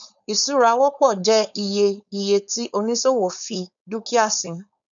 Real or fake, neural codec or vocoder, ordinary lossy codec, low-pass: fake; codec, 16 kHz, 4.8 kbps, FACodec; none; 7.2 kHz